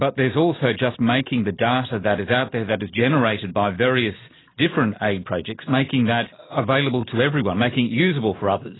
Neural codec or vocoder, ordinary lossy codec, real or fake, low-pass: none; AAC, 16 kbps; real; 7.2 kHz